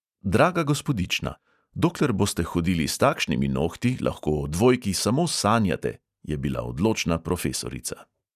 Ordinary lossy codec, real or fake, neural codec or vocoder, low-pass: none; real; none; 14.4 kHz